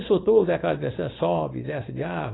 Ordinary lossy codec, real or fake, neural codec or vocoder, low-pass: AAC, 16 kbps; fake; codec, 16 kHz, 4.8 kbps, FACodec; 7.2 kHz